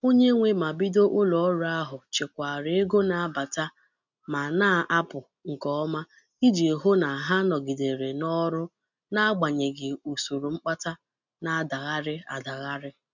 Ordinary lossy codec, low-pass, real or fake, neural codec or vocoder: none; 7.2 kHz; real; none